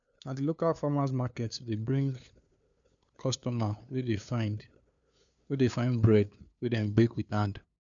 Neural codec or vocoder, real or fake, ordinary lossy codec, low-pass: codec, 16 kHz, 2 kbps, FunCodec, trained on LibriTTS, 25 frames a second; fake; none; 7.2 kHz